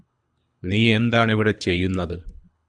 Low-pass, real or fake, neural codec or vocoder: 9.9 kHz; fake; codec, 24 kHz, 3 kbps, HILCodec